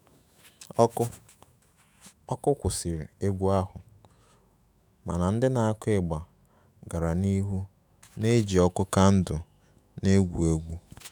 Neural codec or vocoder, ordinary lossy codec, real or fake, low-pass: autoencoder, 48 kHz, 128 numbers a frame, DAC-VAE, trained on Japanese speech; none; fake; none